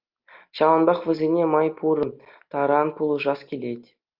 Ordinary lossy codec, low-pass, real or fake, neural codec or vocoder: Opus, 24 kbps; 5.4 kHz; real; none